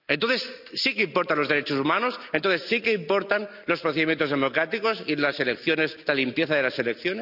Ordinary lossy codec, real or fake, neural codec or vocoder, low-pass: none; real; none; 5.4 kHz